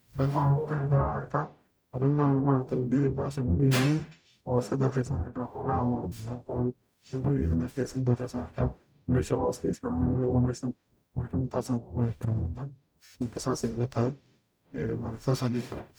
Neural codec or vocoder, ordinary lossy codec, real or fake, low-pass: codec, 44.1 kHz, 0.9 kbps, DAC; none; fake; none